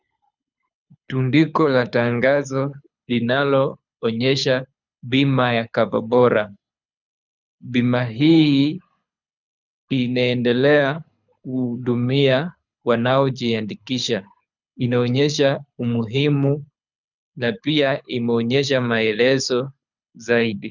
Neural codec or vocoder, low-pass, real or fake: codec, 24 kHz, 6 kbps, HILCodec; 7.2 kHz; fake